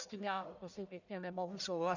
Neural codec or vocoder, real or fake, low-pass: codec, 44.1 kHz, 1.7 kbps, Pupu-Codec; fake; 7.2 kHz